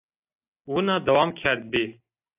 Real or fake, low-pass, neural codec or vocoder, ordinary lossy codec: real; 3.6 kHz; none; AAC, 24 kbps